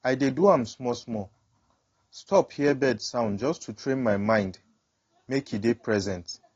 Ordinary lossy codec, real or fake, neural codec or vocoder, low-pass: AAC, 32 kbps; real; none; 7.2 kHz